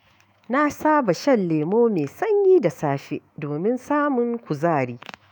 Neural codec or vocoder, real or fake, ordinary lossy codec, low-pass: autoencoder, 48 kHz, 128 numbers a frame, DAC-VAE, trained on Japanese speech; fake; none; none